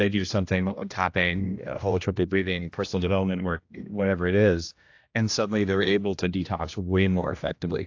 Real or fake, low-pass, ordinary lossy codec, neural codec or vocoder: fake; 7.2 kHz; AAC, 48 kbps; codec, 16 kHz, 1 kbps, X-Codec, HuBERT features, trained on general audio